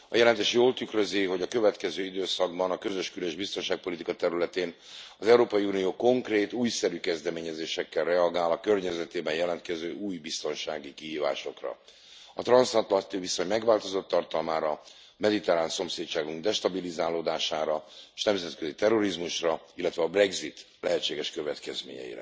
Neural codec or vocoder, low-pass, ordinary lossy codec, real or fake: none; none; none; real